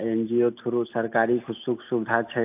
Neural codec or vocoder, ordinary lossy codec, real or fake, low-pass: none; AAC, 32 kbps; real; 3.6 kHz